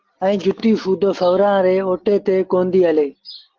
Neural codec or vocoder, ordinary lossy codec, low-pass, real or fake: none; Opus, 16 kbps; 7.2 kHz; real